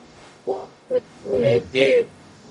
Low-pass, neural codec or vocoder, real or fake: 10.8 kHz; codec, 44.1 kHz, 0.9 kbps, DAC; fake